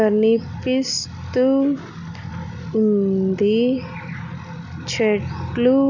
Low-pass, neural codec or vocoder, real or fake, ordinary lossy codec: 7.2 kHz; none; real; none